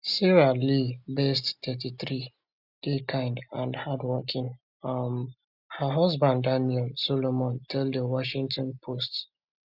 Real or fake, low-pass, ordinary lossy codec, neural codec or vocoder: real; 5.4 kHz; Opus, 64 kbps; none